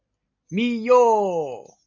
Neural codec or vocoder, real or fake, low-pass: none; real; 7.2 kHz